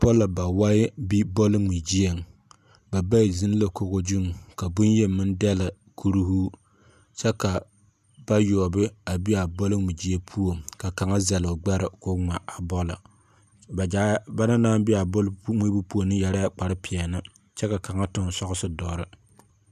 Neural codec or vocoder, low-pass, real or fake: none; 14.4 kHz; real